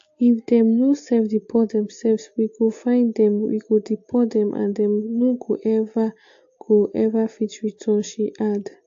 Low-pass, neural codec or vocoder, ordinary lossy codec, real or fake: 7.2 kHz; none; AAC, 48 kbps; real